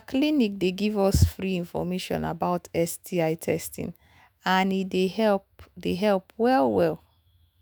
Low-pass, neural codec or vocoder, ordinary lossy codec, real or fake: none; autoencoder, 48 kHz, 128 numbers a frame, DAC-VAE, trained on Japanese speech; none; fake